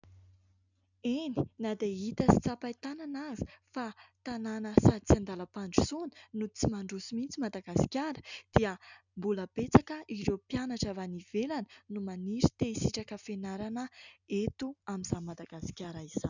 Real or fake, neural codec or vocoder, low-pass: real; none; 7.2 kHz